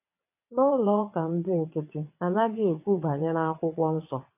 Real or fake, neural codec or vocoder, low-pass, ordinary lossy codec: fake; vocoder, 22.05 kHz, 80 mel bands, WaveNeXt; 3.6 kHz; none